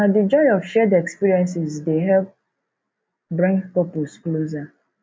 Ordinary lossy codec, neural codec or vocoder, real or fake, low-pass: none; none; real; none